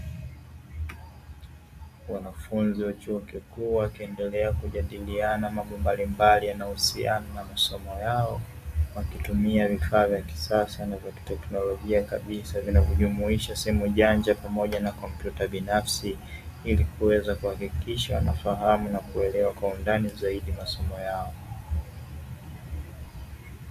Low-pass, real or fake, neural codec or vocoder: 14.4 kHz; real; none